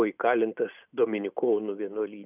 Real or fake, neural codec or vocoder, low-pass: real; none; 3.6 kHz